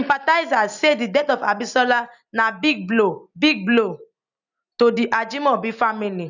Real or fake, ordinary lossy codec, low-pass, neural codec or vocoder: real; none; 7.2 kHz; none